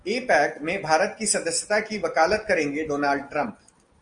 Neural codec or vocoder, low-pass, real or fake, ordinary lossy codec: none; 9.9 kHz; real; Opus, 32 kbps